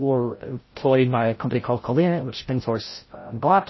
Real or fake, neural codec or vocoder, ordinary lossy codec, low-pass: fake; codec, 16 kHz, 0.5 kbps, FreqCodec, larger model; MP3, 24 kbps; 7.2 kHz